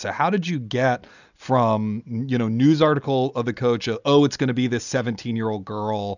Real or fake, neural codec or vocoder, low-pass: real; none; 7.2 kHz